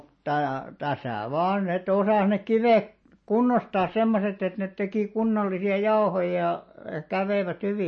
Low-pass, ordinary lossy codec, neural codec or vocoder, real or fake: 10.8 kHz; MP3, 32 kbps; none; real